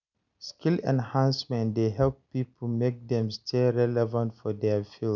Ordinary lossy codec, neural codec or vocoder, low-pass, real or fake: none; none; 7.2 kHz; real